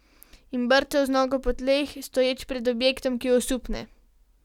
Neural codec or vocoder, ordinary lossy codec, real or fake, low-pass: none; none; real; 19.8 kHz